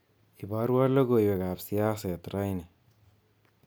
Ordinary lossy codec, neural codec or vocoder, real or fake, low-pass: none; none; real; none